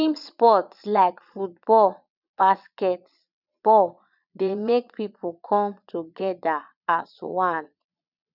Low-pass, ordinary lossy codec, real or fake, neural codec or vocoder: 5.4 kHz; none; fake; vocoder, 22.05 kHz, 80 mel bands, Vocos